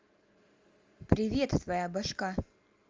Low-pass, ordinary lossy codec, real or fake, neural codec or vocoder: 7.2 kHz; Opus, 32 kbps; real; none